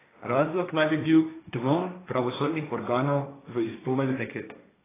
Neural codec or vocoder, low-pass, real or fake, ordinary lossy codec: codec, 16 kHz, 1.1 kbps, Voila-Tokenizer; 3.6 kHz; fake; AAC, 16 kbps